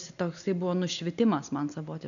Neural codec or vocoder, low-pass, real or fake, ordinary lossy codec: none; 7.2 kHz; real; MP3, 96 kbps